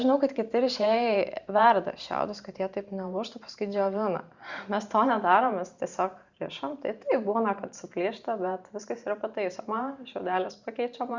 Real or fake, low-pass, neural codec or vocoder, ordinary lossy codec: fake; 7.2 kHz; vocoder, 44.1 kHz, 128 mel bands every 512 samples, BigVGAN v2; Opus, 64 kbps